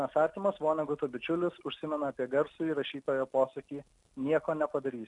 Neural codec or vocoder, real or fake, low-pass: none; real; 10.8 kHz